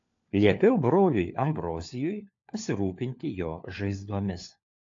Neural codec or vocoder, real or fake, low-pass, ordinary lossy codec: codec, 16 kHz, 4 kbps, FunCodec, trained on LibriTTS, 50 frames a second; fake; 7.2 kHz; AAC, 48 kbps